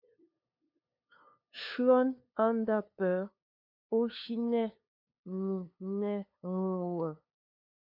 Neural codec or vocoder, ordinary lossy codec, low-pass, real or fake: codec, 16 kHz, 2 kbps, FunCodec, trained on LibriTTS, 25 frames a second; MP3, 48 kbps; 5.4 kHz; fake